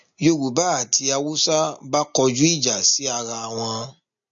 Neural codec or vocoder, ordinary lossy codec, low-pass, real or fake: none; MP3, 48 kbps; 7.2 kHz; real